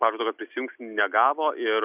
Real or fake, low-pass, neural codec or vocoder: real; 3.6 kHz; none